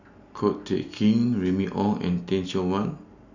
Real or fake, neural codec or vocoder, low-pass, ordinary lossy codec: real; none; 7.2 kHz; none